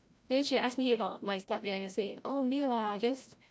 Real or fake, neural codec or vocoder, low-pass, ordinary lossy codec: fake; codec, 16 kHz, 0.5 kbps, FreqCodec, larger model; none; none